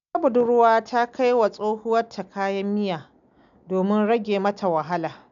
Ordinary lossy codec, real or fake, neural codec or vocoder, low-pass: none; real; none; 7.2 kHz